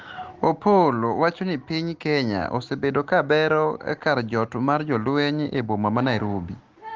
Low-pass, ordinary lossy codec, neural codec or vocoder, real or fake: 7.2 kHz; Opus, 16 kbps; none; real